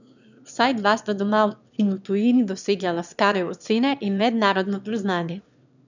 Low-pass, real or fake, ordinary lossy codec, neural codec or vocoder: 7.2 kHz; fake; none; autoencoder, 22.05 kHz, a latent of 192 numbers a frame, VITS, trained on one speaker